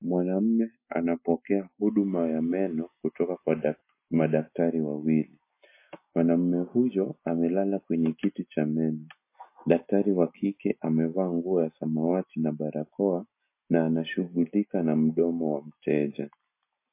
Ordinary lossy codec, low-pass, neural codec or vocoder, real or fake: MP3, 24 kbps; 3.6 kHz; none; real